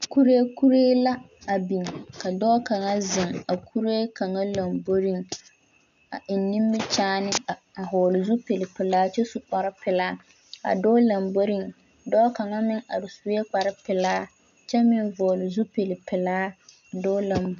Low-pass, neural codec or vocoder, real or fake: 7.2 kHz; none; real